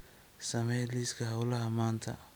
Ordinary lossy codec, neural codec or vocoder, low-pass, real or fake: none; none; none; real